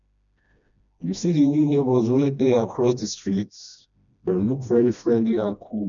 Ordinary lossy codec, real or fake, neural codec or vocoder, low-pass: none; fake; codec, 16 kHz, 1 kbps, FreqCodec, smaller model; 7.2 kHz